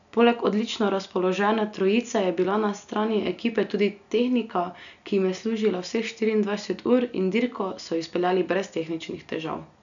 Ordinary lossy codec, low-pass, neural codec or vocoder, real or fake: none; 7.2 kHz; none; real